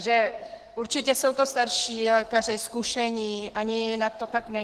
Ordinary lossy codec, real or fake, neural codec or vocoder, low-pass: Opus, 16 kbps; fake; codec, 44.1 kHz, 2.6 kbps, SNAC; 14.4 kHz